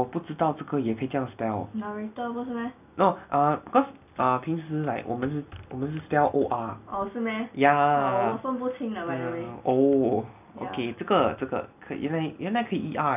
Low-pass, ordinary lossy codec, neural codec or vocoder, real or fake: 3.6 kHz; none; none; real